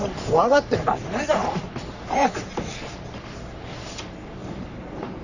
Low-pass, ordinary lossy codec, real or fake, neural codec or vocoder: 7.2 kHz; none; fake; codec, 44.1 kHz, 3.4 kbps, Pupu-Codec